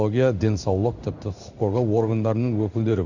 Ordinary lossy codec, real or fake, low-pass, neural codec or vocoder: none; fake; 7.2 kHz; codec, 16 kHz in and 24 kHz out, 1 kbps, XY-Tokenizer